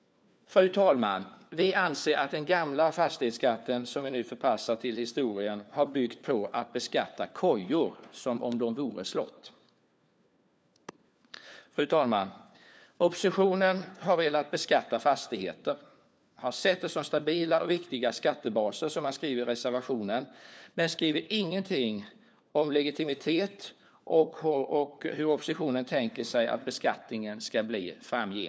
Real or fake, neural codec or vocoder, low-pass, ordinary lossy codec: fake; codec, 16 kHz, 4 kbps, FunCodec, trained on LibriTTS, 50 frames a second; none; none